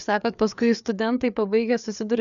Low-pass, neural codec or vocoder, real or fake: 7.2 kHz; codec, 16 kHz, 4 kbps, FreqCodec, larger model; fake